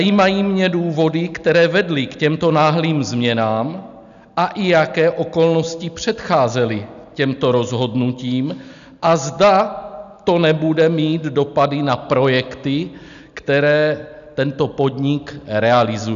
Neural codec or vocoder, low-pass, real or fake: none; 7.2 kHz; real